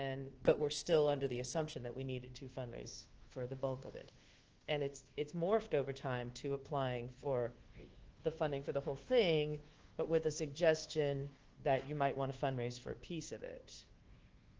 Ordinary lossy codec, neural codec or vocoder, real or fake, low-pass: Opus, 16 kbps; codec, 24 kHz, 1.2 kbps, DualCodec; fake; 7.2 kHz